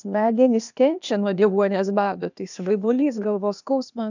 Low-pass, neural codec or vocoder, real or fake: 7.2 kHz; codec, 16 kHz, 0.8 kbps, ZipCodec; fake